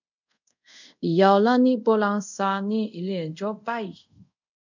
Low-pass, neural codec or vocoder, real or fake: 7.2 kHz; codec, 24 kHz, 0.5 kbps, DualCodec; fake